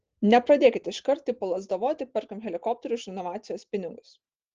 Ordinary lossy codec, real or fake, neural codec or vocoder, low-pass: Opus, 16 kbps; real; none; 7.2 kHz